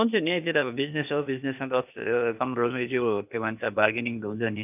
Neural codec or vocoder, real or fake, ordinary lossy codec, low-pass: codec, 16 kHz, 0.8 kbps, ZipCodec; fake; none; 3.6 kHz